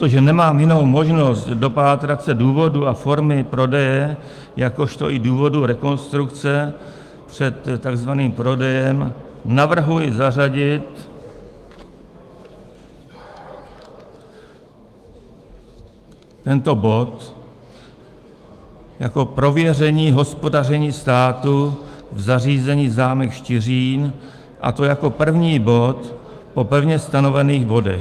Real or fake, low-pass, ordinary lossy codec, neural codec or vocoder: fake; 14.4 kHz; Opus, 32 kbps; autoencoder, 48 kHz, 128 numbers a frame, DAC-VAE, trained on Japanese speech